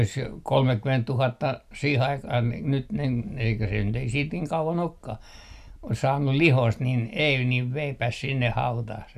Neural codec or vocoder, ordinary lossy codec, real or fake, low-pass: none; none; real; 14.4 kHz